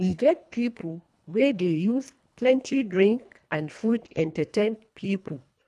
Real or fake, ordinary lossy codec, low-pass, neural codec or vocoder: fake; none; none; codec, 24 kHz, 1.5 kbps, HILCodec